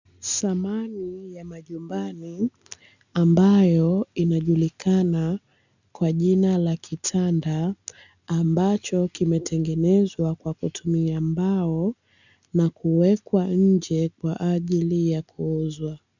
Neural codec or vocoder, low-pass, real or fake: none; 7.2 kHz; real